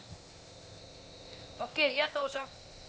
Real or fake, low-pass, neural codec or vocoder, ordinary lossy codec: fake; none; codec, 16 kHz, 0.8 kbps, ZipCodec; none